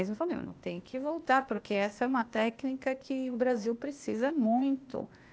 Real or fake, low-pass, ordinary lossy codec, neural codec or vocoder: fake; none; none; codec, 16 kHz, 0.8 kbps, ZipCodec